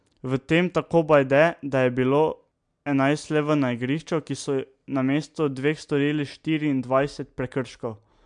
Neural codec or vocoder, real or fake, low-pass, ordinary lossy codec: none; real; 9.9 kHz; MP3, 64 kbps